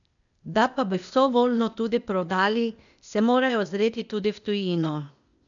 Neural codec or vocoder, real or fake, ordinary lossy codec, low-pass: codec, 16 kHz, 0.8 kbps, ZipCodec; fake; none; 7.2 kHz